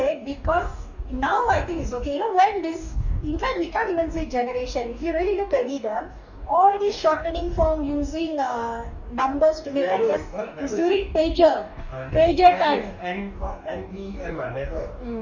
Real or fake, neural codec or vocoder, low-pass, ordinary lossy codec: fake; codec, 44.1 kHz, 2.6 kbps, DAC; 7.2 kHz; none